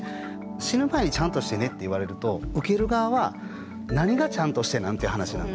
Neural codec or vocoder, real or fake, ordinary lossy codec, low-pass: none; real; none; none